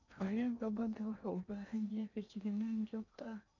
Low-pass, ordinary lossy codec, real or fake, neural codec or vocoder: 7.2 kHz; none; fake; codec, 16 kHz in and 24 kHz out, 0.8 kbps, FocalCodec, streaming, 65536 codes